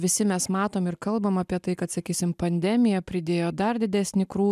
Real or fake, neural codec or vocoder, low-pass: real; none; 14.4 kHz